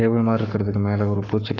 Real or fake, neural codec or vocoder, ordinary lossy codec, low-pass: fake; codec, 44.1 kHz, 7.8 kbps, Pupu-Codec; none; 7.2 kHz